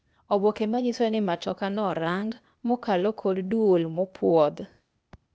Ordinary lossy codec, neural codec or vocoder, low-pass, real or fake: none; codec, 16 kHz, 0.8 kbps, ZipCodec; none; fake